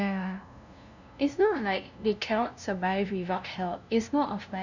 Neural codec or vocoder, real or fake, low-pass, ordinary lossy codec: codec, 16 kHz, 0.5 kbps, FunCodec, trained on LibriTTS, 25 frames a second; fake; 7.2 kHz; none